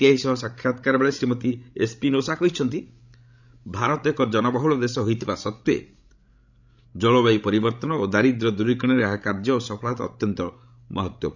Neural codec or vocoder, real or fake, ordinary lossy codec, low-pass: codec, 16 kHz, 16 kbps, FreqCodec, larger model; fake; none; 7.2 kHz